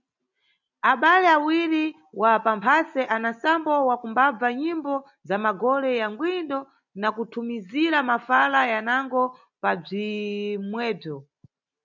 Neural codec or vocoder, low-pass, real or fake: none; 7.2 kHz; real